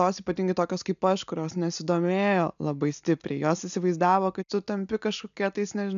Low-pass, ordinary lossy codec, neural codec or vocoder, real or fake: 7.2 kHz; MP3, 96 kbps; none; real